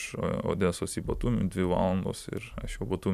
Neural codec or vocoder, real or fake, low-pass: none; real; 14.4 kHz